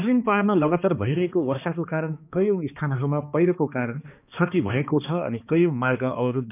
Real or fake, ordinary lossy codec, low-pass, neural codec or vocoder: fake; none; 3.6 kHz; codec, 16 kHz, 2 kbps, X-Codec, HuBERT features, trained on balanced general audio